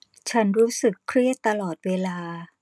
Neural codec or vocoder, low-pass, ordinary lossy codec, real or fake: none; none; none; real